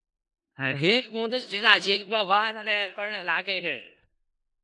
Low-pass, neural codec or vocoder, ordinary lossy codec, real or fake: 10.8 kHz; codec, 16 kHz in and 24 kHz out, 0.4 kbps, LongCat-Audio-Codec, four codebook decoder; AAC, 64 kbps; fake